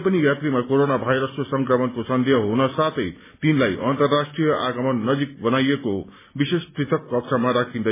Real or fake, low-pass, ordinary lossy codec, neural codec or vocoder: real; 3.6 kHz; MP3, 16 kbps; none